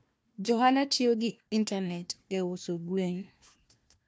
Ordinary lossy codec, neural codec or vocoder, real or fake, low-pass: none; codec, 16 kHz, 1 kbps, FunCodec, trained on Chinese and English, 50 frames a second; fake; none